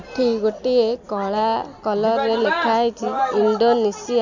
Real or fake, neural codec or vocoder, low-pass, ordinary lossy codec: real; none; 7.2 kHz; none